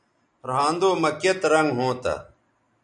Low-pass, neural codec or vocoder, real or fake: 10.8 kHz; none; real